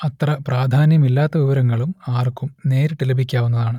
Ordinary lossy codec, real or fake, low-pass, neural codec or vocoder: none; real; 19.8 kHz; none